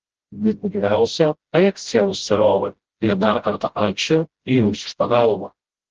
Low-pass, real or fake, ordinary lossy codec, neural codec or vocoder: 7.2 kHz; fake; Opus, 16 kbps; codec, 16 kHz, 0.5 kbps, FreqCodec, smaller model